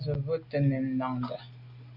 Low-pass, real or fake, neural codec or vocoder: 5.4 kHz; real; none